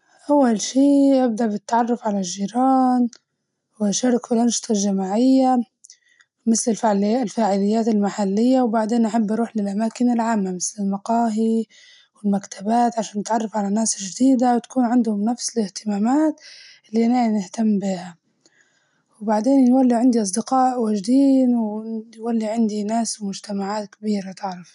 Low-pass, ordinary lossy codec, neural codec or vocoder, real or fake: 10.8 kHz; none; none; real